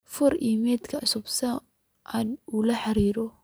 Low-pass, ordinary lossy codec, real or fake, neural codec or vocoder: none; none; real; none